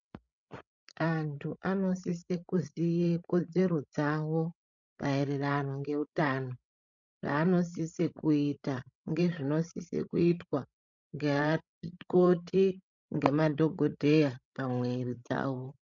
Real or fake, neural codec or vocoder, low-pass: fake; codec, 16 kHz, 16 kbps, FreqCodec, larger model; 7.2 kHz